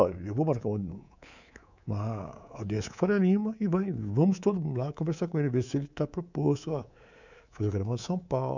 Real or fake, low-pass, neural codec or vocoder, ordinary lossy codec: fake; 7.2 kHz; codec, 24 kHz, 3.1 kbps, DualCodec; none